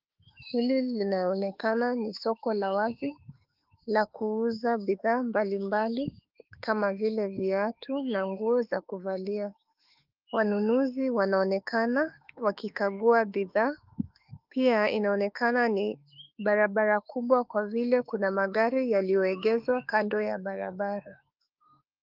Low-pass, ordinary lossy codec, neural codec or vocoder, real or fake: 5.4 kHz; Opus, 32 kbps; autoencoder, 48 kHz, 32 numbers a frame, DAC-VAE, trained on Japanese speech; fake